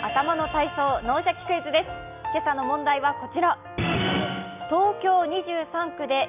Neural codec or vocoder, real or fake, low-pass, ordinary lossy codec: none; real; 3.6 kHz; none